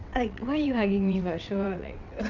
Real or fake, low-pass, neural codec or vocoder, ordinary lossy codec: fake; 7.2 kHz; vocoder, 22.05 kHz, 80 mel bands, WaveNeXt; none